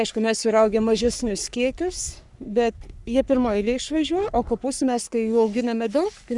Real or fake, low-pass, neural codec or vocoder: fake; 10.8 kHz; codec, 44.1 kHz, 3.4 kbps, Pupu-Codec